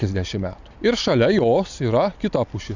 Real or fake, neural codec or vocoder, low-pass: real; none; 7.2 kHz